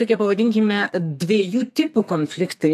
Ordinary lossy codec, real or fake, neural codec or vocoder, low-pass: AAC, 96 kbps; fake; codec, 32 kHz, 1.9 kbps, SNAC; 14.4 kHz